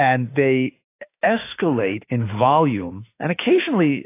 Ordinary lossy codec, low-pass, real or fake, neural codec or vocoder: AAC, 24 kbps; 3.6 kHz; fake; autoencoder, 48 kHz, 32 numbers a frame, DAC-VAE, trained on Japanese speech